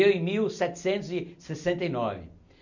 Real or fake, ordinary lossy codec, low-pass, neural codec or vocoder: real; none; 7.2 kHz; none